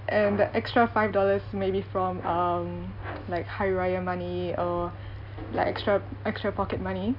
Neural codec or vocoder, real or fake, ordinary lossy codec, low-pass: none; real; none; 5.4 kHz